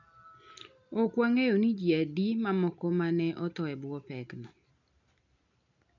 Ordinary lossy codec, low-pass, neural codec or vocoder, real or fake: none; 7.2 kHz; none; real